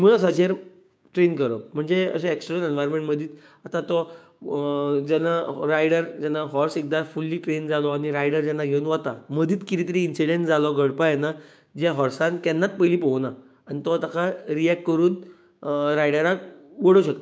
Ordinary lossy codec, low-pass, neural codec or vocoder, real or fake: none; none; codec, 16 kHz, 6 kbps, DAC; fake